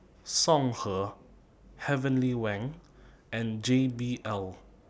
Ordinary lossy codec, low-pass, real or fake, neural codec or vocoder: none; none; real; none